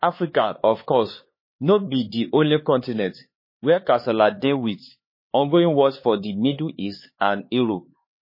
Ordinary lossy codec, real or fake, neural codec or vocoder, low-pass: MP3, 24 kbps; fake; codec, 16 kHz, 4 kbps, X-Codec, HuBERT features, trained on LibriSpeech; 5.4 kHz